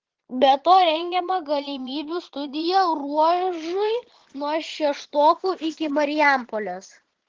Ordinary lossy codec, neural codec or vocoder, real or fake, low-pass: Opus, 16 kbps; vocoder, 22.05 kHz, 80 mel bands, Vocos; fake; 7.2 kHz